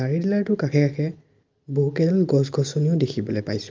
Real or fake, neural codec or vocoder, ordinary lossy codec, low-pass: real; none; Opus, 24 kbps; 7.2 kHz